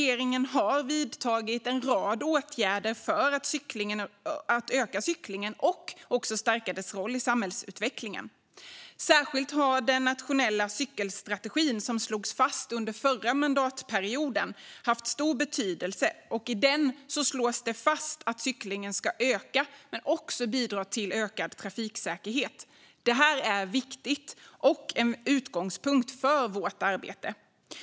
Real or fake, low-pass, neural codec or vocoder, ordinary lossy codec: real; none; none; none